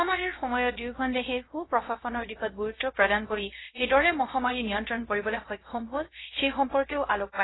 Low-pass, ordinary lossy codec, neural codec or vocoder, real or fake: 7.2 kHz; AAC, 16 kbps; codec, 16 kHz, 0.3 kbps, FocalCodec; fake